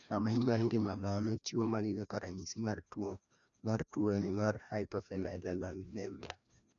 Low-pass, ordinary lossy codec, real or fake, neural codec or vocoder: 7.2 kHz; none; fake; codec, 16 kHz, 1 kbps, FreqCodec, larger model